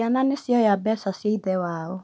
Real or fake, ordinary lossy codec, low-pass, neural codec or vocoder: real; none; none; none